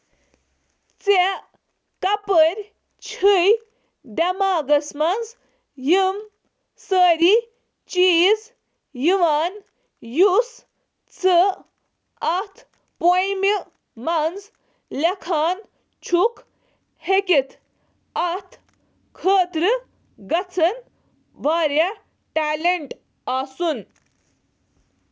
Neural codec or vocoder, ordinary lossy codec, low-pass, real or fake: none; none; none; real